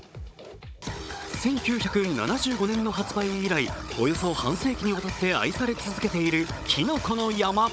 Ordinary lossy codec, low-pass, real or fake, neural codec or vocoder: none; none; fake; codec, 16 kHz, 16 kbps, FunCodec, trained on Chinese and English, 50 frames a second